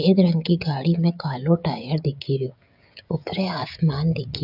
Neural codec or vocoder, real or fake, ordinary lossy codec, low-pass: codec, 16 kHz, 16 kbps, FreqCodec, smaller model; fake; none; 5.4 kHz